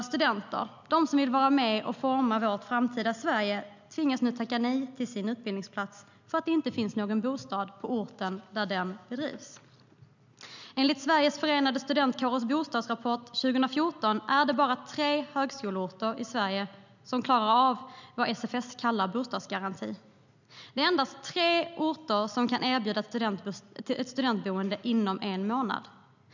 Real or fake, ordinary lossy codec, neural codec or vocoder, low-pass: real; none; none; 7.2 kHz